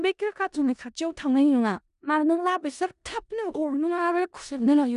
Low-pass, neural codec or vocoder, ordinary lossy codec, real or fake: 10.8 kHz; codec, 16 kHz in and 24 kHz out, 0.4 kbps, LongCat-Audio-Codec, four codebook decoder; none; fake